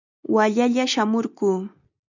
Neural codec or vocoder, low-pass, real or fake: none; 7.2 kHz; real